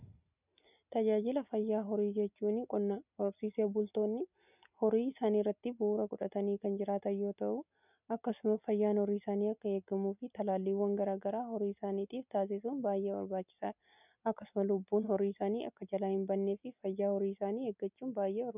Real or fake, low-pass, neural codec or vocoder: real; 3.6 kHz; none